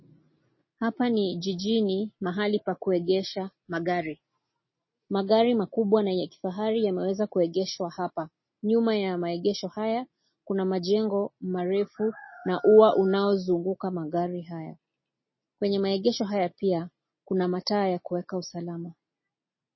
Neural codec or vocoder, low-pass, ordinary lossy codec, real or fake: none; 7.2 kHz; MP3, 24 kbps; real